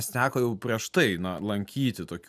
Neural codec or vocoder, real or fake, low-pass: none; real; 14.4 kHz